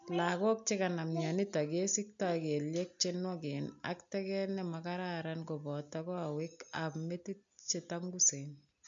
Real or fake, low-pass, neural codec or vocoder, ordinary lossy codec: real; 7.2 kHz; none; AAC, 64 kbps